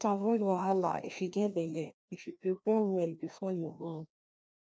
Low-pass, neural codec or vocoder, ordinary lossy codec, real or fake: none; codec, 16 kHz, 1 kbps, FreqCodec, larger model; none; fake